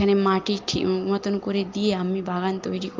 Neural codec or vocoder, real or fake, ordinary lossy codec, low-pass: none; real; Opus, 32 kbps; 7.2 kHz